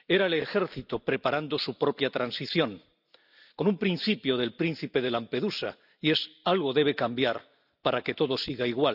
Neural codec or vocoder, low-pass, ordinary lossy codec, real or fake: none; 5.4 kHz; none; real